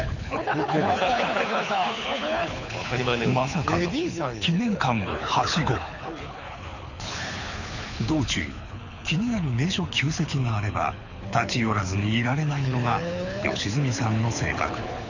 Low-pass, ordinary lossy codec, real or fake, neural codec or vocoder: 7.2 kHz; none; fake; codec, 24 kHz, 6 kbps, HILCodec